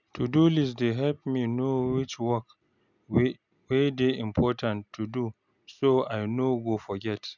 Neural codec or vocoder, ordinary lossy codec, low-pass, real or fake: none; none; 7.2 kHz; real